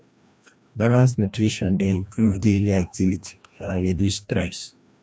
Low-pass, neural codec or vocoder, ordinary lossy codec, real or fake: none; codec, 16 kHz, 1 kbps, FreqCodec, larger model; none; fake